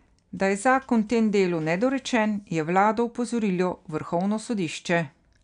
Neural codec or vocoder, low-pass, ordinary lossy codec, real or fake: none; 9.9 kHz; none; real